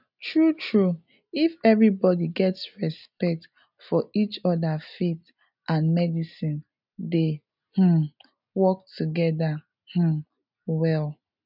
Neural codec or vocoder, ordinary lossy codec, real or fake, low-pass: none; none; real; 5.4 kHz